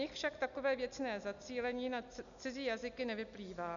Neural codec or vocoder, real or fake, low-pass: none; real; 7.2 kHz